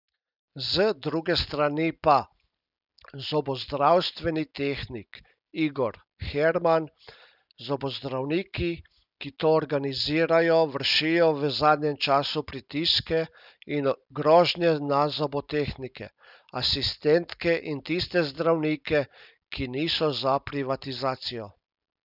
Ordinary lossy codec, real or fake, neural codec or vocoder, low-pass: none; real; none; 5.4 kHz